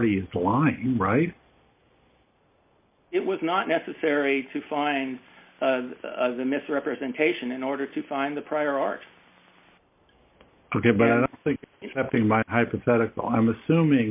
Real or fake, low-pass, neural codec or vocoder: real; 3.6 kHz; none